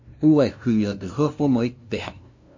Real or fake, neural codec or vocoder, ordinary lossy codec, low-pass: fake; codec, 16 kHz, 0.5 kbps, FunCodec, trained on LibriTTS, 25 frames a second; MP3, 48 kbps; 7.2 kHz